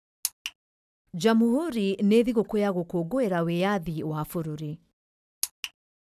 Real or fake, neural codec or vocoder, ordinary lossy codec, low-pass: real; none; none; 14.4 kHz